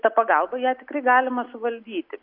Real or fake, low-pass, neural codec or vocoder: real; 5.4 kHz; none